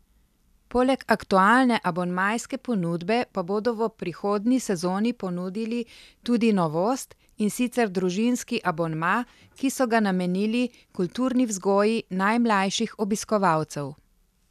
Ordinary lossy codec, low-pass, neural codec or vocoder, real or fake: none; 14.4 kHz; none; real